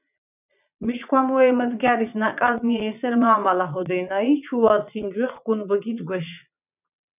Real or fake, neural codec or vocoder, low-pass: fake; vocoder, 22.05 kHz, 80 mel bands, Vocos; 3.6 kHz